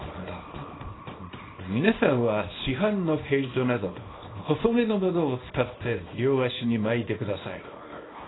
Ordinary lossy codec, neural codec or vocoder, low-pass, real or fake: AAC, 16 kbps; codec, 24 kHz, 0.9 kbps, WavTokenizer, small release; 7.2 kHz; fake